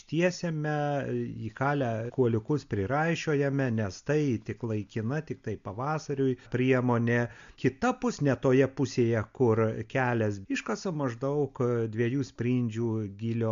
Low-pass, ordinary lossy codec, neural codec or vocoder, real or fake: 7.2 kHz; MP3, 64 kbps; none; real